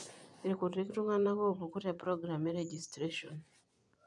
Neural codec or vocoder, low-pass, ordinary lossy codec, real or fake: vocoder, 24 kHz, 100 mel bands, Vocos; 10.8 kHz; none; fake